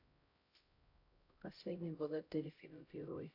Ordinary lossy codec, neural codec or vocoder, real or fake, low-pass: AAC, 32 kbps; codec, 16 kHz, 0.5 kbps, X-Codec, HuBERT features, trained on LibriSpeech; fake; 5.4 kHz